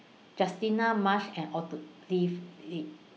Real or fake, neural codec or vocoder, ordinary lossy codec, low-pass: real; none; none; none